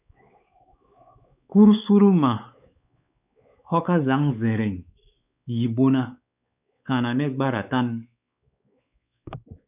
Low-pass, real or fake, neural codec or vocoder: 3.6 kHz; fake; codec, 16 kHz, 4 kbps, X-Codec, WavLM features, trained on Multilingual LibriSpeech